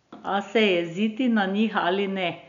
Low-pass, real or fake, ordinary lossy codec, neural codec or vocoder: 7.2 kHz; real; none; none